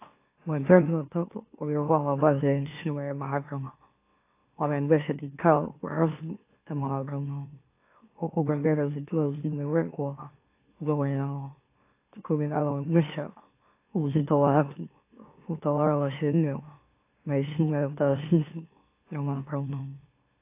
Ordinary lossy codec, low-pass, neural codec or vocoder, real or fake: AAC, 24 kbps; 3.6 kHz; autoencoder, 44.1 kHz, a latent of 192 numbers a frame, MeloTTS; fake